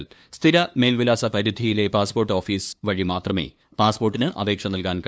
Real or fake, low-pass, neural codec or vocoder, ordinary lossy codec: fake; none; codec, 16 kHz, 2 kbps, FunCodec, trained on LibriTTS, 25 frames a second; none